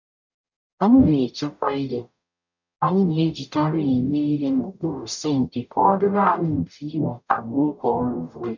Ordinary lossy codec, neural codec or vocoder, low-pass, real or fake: none; codec, 44.1 kHz, 0.9 kbps, DAC; 7.2 kHz; fake